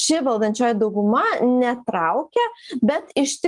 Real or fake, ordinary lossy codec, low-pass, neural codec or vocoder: real; Opus, 32 kbps; 10.8 kHz; none